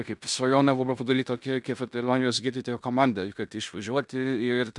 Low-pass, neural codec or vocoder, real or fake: 10.8 kHz; codec, 16 kHz in and 24 kHz out, 0.9 kbps, LongCat-Audio-Codec, fine tuned four codebook decoder; fake